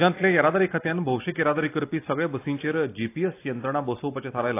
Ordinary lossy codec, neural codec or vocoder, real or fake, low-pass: AAC, 24 kbps; none; real; 3.6 kHz